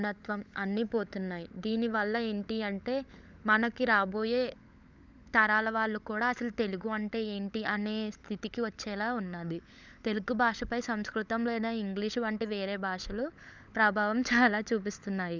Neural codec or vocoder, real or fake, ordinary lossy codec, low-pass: codec, 16 kHz, 16 kbps, FunCodec, trained on LibriTTS, 50 frames a second; fake; none; none